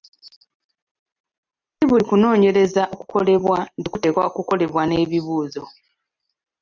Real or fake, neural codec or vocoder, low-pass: real; none; 7.2 kHz